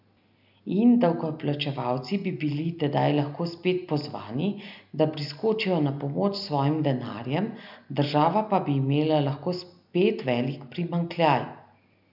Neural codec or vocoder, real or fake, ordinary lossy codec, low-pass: none; real; none; 5.4 kHz